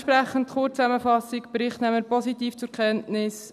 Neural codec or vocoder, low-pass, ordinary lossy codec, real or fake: none; 14.4 kHz; none; real